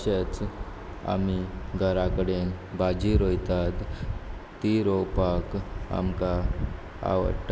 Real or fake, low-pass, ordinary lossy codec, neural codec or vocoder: real; none; none; none